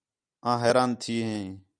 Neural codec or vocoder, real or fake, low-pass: none; real; 9.9 kHz